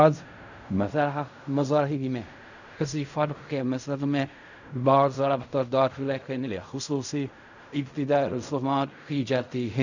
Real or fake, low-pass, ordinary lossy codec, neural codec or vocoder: fake; 7.2 kHz; none; codec, 16 kHz in and 24 kHz out, 0.4 kbps, LongCat-Audio-Codec, fine tuned four codebook decoder